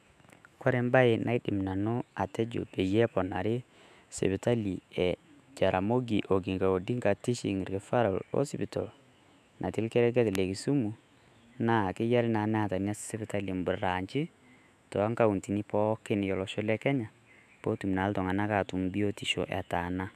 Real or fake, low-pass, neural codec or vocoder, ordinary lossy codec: fake; 14.4 kHz; autoencoder, 48 kHz, 128 numbers a frame, DAC-VAE, trained on Japanese speech; none